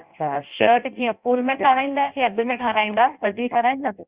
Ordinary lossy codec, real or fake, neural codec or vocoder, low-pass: Opus, 64 kbps; fake; codec, 16 kHz in and 24 kHz out, 0.6 kbps, FireRedTTS-2 codec; 3.6 kHz